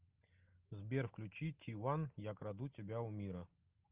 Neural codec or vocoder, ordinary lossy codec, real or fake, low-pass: none; Opus, 24 kbps; real; 3.6 kHz